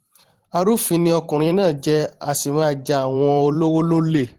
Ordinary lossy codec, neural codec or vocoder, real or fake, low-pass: Opus, 16 kbps; vocoder, 44.1 kHz, 128 mel bands every 512 samples, BigVGAN v2; fake; 19.8 kHz